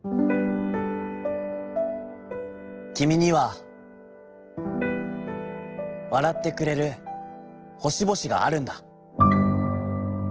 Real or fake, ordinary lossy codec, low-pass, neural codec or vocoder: real; Opus, 16 kbps; 7.2 kHz; none